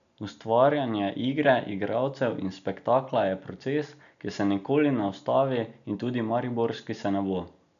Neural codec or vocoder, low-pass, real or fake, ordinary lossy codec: none; 7.2 kHz; real; none